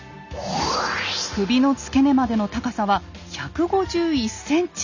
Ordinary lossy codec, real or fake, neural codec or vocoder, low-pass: none; real; none; 7.2 kHz